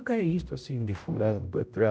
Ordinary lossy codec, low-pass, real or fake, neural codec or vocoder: none; none; fake; codec, 16 kHz, 0.5 kbps, X-Codec, HuBERT features, trained on general audio